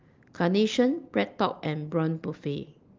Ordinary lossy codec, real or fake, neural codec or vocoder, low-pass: Opus, 24 kbps; real; none; 7.2 kHz